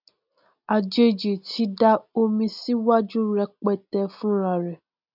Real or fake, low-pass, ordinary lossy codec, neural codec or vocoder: real; 5.4 kHz; none; none